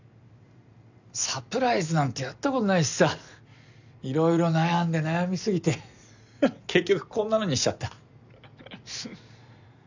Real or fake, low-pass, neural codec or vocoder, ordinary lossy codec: real; 7.2 kHz; none; none